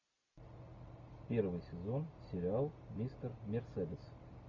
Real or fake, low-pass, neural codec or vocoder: real; 7.2 kHz; none